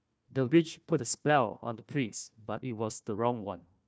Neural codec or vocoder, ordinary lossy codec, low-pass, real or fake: codec, 16 kHz, 1 kbps, FunCodec, trained on Chinese and English, 50 frames a second; none; none; fake